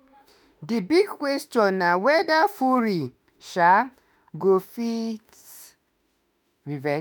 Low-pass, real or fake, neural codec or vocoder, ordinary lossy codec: none; fake; autoencoder, 48 kHz, 32 numbers a frame, DAC-VAE, trained on Japanese speech; none